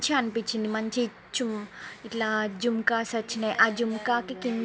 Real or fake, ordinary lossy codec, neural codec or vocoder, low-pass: real; none; none; none